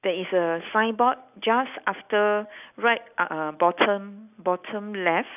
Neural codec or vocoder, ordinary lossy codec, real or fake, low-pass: none; none; real; 3.6 kHz